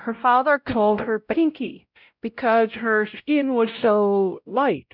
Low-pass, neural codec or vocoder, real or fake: 5.4 kHz; codec, 16 kHz, 0.5 kbps, X-Codec, WavLM features, trained on Multilingual LibriSpeech; fake